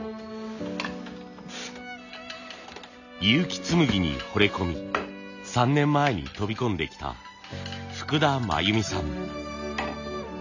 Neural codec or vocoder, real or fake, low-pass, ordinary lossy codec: none; real; 7.2 kHz; none